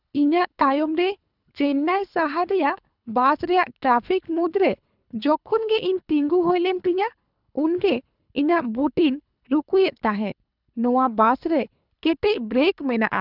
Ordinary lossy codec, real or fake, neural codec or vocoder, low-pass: none; fake; codec, 24 kHz, 3 kbps, HILCodec; 5.4 kHz